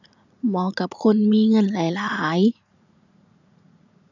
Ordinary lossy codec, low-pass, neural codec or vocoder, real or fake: none; 7.2 kHz; vocoder, 44.1 kHz, 80 mel bands, Vocos; fake